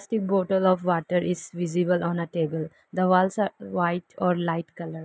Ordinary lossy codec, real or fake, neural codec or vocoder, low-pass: none; real; none; none